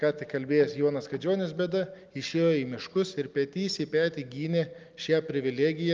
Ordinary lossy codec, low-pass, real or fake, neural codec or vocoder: Opus, 24 kbps; 7.2 kHz; real; none